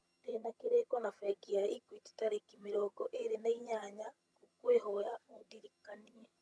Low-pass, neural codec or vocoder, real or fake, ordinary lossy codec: none; vocoder, 22.05 kHz, 80 mel bands, HiFi-GAN; fake; none